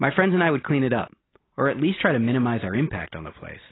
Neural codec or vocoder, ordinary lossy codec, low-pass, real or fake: none; AAC, 16 kbps; 7.2 kHz; real